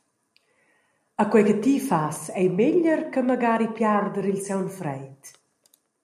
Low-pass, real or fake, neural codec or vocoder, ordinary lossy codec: 14.4 kHz; real; none; MP3, 64 kbps